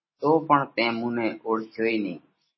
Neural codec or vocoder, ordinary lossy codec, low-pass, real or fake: none; MP3, 24 kbps; 7.2 kHz; real